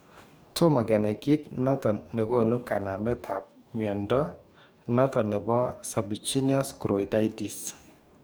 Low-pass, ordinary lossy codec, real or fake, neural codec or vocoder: none; none; fake; codec, 44.1 kHz, 2.6 kbps, DAC